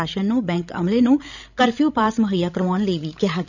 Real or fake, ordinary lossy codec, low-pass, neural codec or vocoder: fake; none; 7.2 kHz; codec, 16 kHz, 16 kbps, FreqCodec, larger model